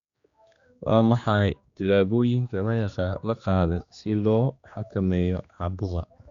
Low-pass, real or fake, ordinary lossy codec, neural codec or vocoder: 7.2 kHz; fake; Opus, 64 kbps; codec, 16 kHz, 2 kbps, X-Codec, HuBERT features, trained on general audio